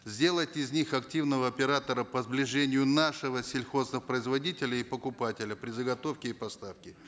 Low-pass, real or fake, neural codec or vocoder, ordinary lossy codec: none; real; none; none